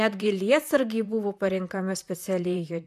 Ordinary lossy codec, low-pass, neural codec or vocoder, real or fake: MP3, 96 kbps; 14.4 kHz; vocoder, 44.1 kHz, 128 mel bands, Pupu-Vocoder; fake